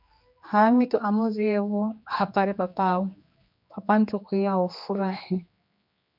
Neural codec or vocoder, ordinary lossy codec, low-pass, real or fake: codec, 16 kHz, 2 kbps, X-Codec, HuBERT features, trained on general audio; MP3, 48 kbps; 5.4 kHz; fake